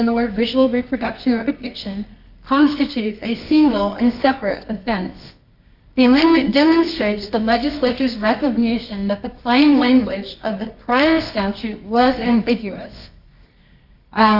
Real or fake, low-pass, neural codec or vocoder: fake; 5.4 kHz; codec, 24 kHz, 0.9 kbps, WavTokenizer, medium music audio release